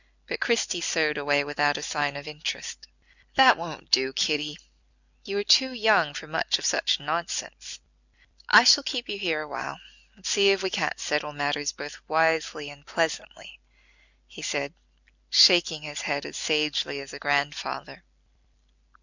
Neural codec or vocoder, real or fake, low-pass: none; real; 7.2 kHz